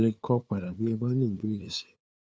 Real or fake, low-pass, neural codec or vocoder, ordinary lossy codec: fake; none; codec, 16 kHz, 2 kbps, FunCodec, trained on LibriTTS, 25 frames a second; none